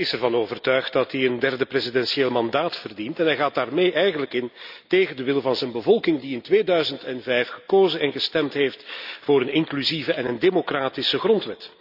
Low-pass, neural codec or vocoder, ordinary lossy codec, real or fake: 5.4 kHz; none; none; real